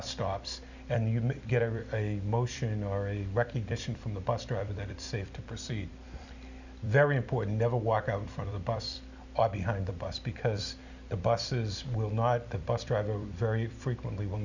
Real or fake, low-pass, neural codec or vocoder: fake; 7.2 kHz; vocoder, 44.1 kHz, 128 mel bands every 256 samples, BigVGAN v2